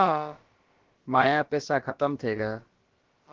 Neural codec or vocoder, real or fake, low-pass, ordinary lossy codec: codec, 16 kHz, about 1 kbps, DyCAST, with the encoder's durations; fake; 7.2 kHz; Opus, 16 kbps